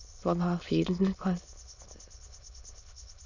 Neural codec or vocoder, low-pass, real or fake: autoencoder, 22.05 kHz, a latent of 192 numbers a frame, VITS, trained on many speakers; 7.2 kHz; fake